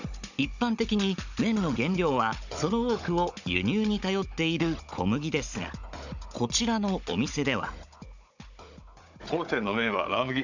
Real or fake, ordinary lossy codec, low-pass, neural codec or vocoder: fake; none; 7.2 kHz; codec, 16 kHz, 16 kbps, FunCodec, trained on Chinese and English, 50 frames a second